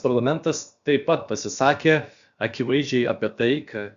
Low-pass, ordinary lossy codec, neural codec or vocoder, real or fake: 7.2 kHz; Opus, 64 kbps; codec, 16 kHz, about 1 kbps, DyCAST, with the encoder's durations; fake